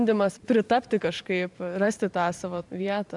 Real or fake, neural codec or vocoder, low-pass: fake; vocoder, 44.1 kHz, 128 mel bands every 512 samples, BigVGAN v2; 10.8 kHz